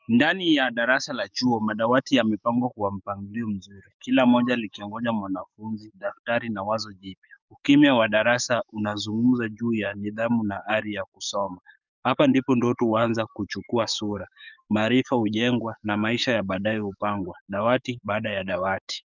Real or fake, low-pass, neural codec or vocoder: fake; 7.2 kHz; codec, 44.1 kHz, 7.8 kbps, DAC